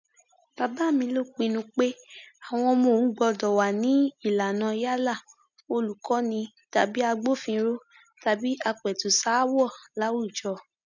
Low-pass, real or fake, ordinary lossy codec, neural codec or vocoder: 7.2 kHz; real; none; none